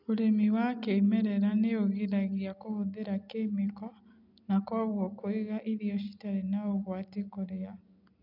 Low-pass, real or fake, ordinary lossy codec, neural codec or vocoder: 5.4 kHz; real; none; none